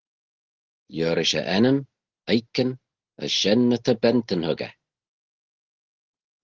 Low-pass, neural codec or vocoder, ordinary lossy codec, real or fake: 7.2 kHz; none; Opus, 16 kbps; real